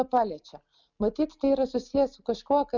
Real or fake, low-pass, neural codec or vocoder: real; 7.2 kHz; none